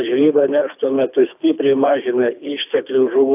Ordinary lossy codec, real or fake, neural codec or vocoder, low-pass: AAC, 32 kbps; fake; codec, 24 kHz, 3 kbps, HILCodec; 3.6 kHz